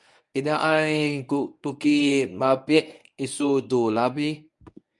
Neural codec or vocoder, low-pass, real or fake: codec, 24 kHz, 0.9 kbps, WavTokenizer, medium speech release version 1; 10.8 kHz; fake